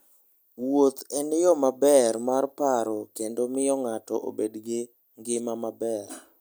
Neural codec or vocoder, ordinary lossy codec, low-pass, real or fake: none; none; none; real